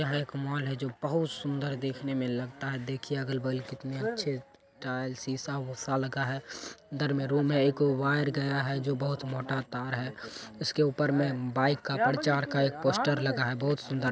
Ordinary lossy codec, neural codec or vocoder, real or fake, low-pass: none; none; real; none